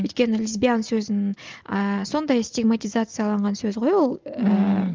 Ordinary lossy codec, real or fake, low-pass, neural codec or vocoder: Opus, 32 kbps; real; 7.2 kHz; none